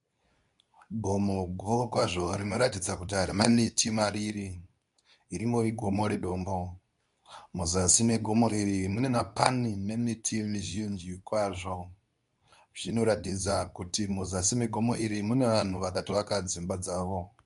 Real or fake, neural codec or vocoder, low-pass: fake; codec, 24 kHz, 0.9 kbps, WavTokenizer, medium speech release version 2; 10.8 kHz